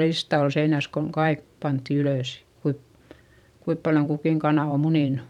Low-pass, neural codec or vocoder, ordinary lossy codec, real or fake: 19.8 kHz; vocoder, 44.1 kHz, 128 mel bands every 512 samples, BigVGAN v2; none; fake